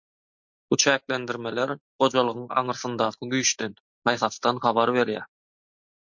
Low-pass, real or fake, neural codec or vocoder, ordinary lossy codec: 7.2 kHz; real; none; MP3, 48 kbps